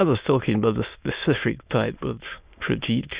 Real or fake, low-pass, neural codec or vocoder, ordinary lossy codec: fake; 3.6 kHz; autoencoder, 22.05 kHz, a latent of 192 numbers a frame, VITS, trained on many speakers; Opus, 64 kbps